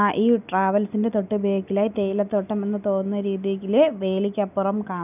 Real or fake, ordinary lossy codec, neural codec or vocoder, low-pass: real; none; none; 3.6 kHz